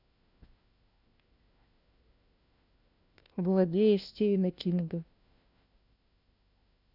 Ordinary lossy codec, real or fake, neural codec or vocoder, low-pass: none; fake; codec, 16 kHz, 1 kbps, FunCodec, trained on LibriTTS, 50 frames a second; 5.4 kHz